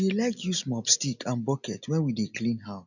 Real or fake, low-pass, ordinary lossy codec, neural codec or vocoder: real; 7.2 kHz; none; none